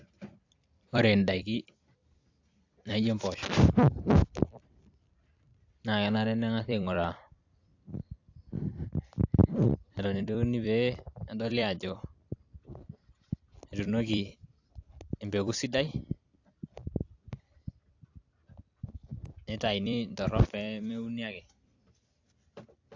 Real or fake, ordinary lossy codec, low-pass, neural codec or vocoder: fake; AAC, 48 kbps; 7.2 kHz; vocoder, 44.1 kHz, 128 mel bands every 256 samples, BigVGAN v2